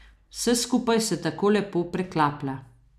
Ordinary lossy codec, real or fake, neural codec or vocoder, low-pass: none; real; none; 14.4 kHz